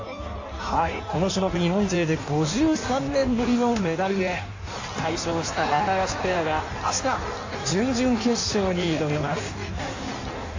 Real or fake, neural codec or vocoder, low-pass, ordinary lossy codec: fake; codec, 16 kHz in and 24 kHz out, 1.1 kbps, FireRedTTS-2 codec; 7.2 kHz; AAC, 48 kbps